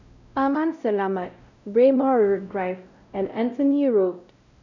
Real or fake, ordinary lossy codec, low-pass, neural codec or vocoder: fake; none; 7.2 kHz; codec, 16 kHz, 0.5 kbps, X-Codec, WavLM features, trained on Multilingual LibriSpeech